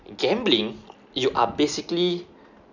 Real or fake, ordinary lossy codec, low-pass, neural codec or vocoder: real; none; 7.2 kHz; none